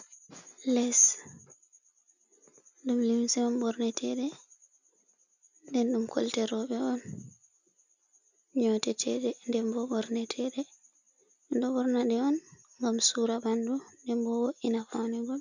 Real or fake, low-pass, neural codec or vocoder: real; 7.2 kHz; none